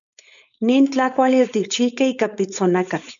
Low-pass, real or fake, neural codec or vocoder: 7.2 kHz; fake; codec, 16 kHz, 4.8 kbps, FACodec